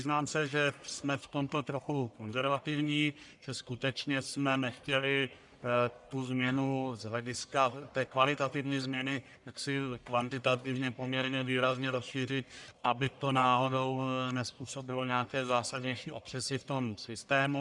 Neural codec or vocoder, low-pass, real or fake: codec, 44.1 kHz, 1.7 kbps, Pupu-Codec; 10.8 kHz; fake